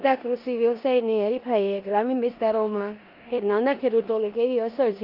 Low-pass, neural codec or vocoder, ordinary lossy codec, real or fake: 5.4 kHz; codec, 16 kHz in and 24 kHz out, 0.9 kbps, LongCat-Audio-Codec, four codebook decoder; Opus, 32 kbps; fake